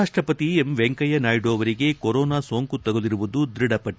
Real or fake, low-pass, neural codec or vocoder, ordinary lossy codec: real; none; none; none